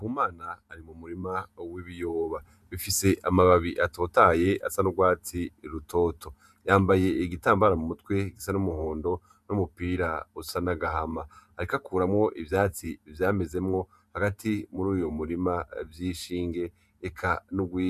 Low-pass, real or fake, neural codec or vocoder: 14.4 kHz; fake; vocoder, 48 kHz, 128 mel bands, Vocos